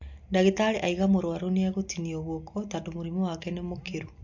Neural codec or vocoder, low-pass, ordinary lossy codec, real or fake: none; 7.2 kHz; MP3, 48 kbps; real